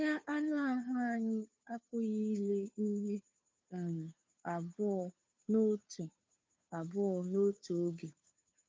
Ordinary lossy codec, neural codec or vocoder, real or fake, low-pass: none; codec, 16 kHz, 2 kbps, FunCodec, trained on Chinese and English, 25 frames a second; fake; none